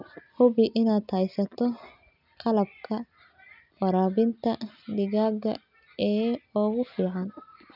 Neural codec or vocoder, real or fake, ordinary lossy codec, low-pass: none; real; none; 5.4 kHz